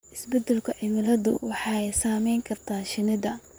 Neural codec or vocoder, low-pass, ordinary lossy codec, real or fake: vocoder, 44.1 kHz, 128 mel bands, Pupu-Vocoder; none; none; fake